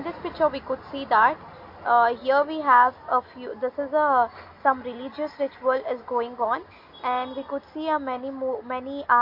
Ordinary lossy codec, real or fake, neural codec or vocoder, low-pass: MP3, 32 kbps; real; none; 5.4 kHz